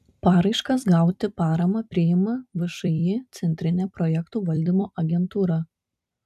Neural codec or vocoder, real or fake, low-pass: vocoder, 44.1 kHz, 128 mel bands every 256 samples, BigVGAN v2; fake; 14.4 kHz